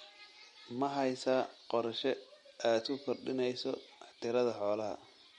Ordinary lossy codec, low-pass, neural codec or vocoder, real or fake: MP3, 48 kbps; 19.8 kHz; none; real